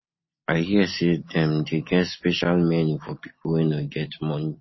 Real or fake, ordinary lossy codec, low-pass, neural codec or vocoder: real; MP3, 24 kbps; 7.2 kHz; none